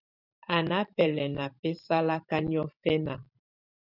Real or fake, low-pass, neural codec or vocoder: fake; 5.4 kHz; vocoder, 44.1 kHz, 128 mel bands every 512 samples, BigVGAN v2